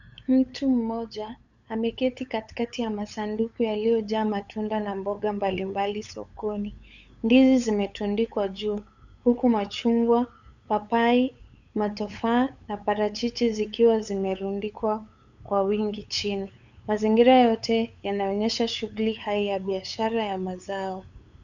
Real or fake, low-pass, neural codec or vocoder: fake; 7.2 kHz; codec, 16 kHz, 8 kbps, FunCodec, trained on LibriTTS, 25 frames a second